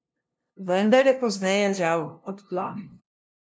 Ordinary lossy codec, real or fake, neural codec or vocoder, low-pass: none; fake; codec, 16 kHz, 0.5 kbps, FunCodec, trained on LibriTTS, 25 frames a second; none